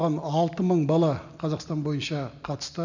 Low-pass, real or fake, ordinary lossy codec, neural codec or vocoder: 7.2 kHz; real; none; none